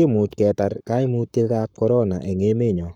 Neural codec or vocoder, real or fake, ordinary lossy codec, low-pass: codec, 44.1 kHz, 7.8 kbps, Pupu-Codec; fake; none; 19.8 kHz